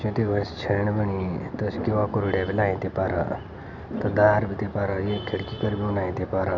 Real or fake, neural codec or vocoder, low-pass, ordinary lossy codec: real; none; 7.2 kHz; none